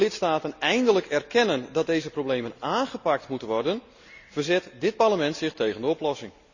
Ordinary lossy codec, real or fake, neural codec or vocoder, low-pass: none; real; none; 7.2 kHz